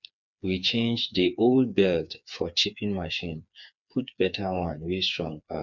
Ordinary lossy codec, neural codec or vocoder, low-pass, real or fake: none; codec, 16 kHz, 4 kbps, FreqCodec, smaller model; 7.2 kHz; fake